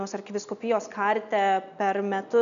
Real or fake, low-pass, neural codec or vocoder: real; 7.2 kHz; none